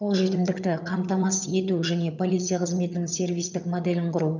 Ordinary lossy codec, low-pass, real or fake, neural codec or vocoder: none; 7.2 kHz; fake; vocoder, 22.05 kHz, 80 mel bands, HiFi-GAN